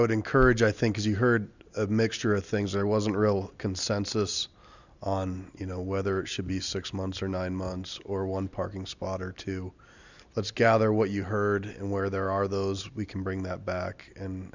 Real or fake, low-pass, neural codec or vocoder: real; 7.2 kHz; none